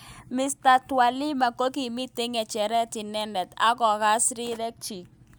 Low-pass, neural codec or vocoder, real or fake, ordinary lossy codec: none; none; real; none